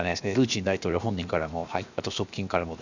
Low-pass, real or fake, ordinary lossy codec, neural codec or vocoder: 7.2 kHz; fake; none; codec, 16 kHz, 0.7 kbps, FocalCodec